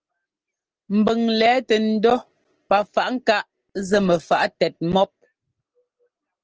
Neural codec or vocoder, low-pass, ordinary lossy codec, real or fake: none; 7.2 kHz; Opus, 16 kbps; real